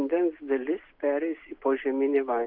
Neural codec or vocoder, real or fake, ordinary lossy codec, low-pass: none; real; Opus, 24 kbps; 5.4 kHz